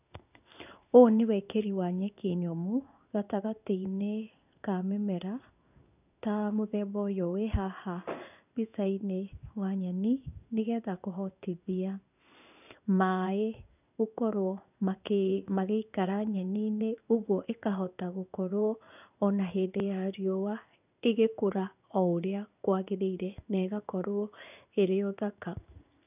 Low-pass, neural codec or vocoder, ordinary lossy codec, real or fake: 3.6 kHz; codec, 16 kHz in and 24 kHz out, 1 kbps, XY-Tokenizer; none; fake